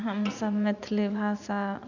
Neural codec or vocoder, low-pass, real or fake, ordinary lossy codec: vocoder, 44.1 kHz, 80 mel bands, Vocos; 7.2 kHz; fake; none